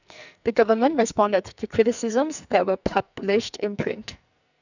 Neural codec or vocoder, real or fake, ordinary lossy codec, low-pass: codec, 44.1 kHz, 2.6 kbps, SNAC; fake; none; 7.2 kHz